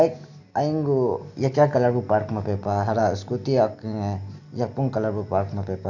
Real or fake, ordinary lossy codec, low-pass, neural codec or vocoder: real; none; 7.2 kHz; none